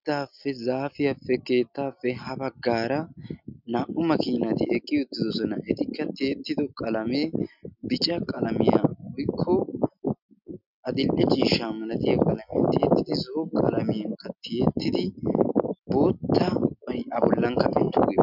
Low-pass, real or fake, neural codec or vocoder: 5.4 kHz; real; none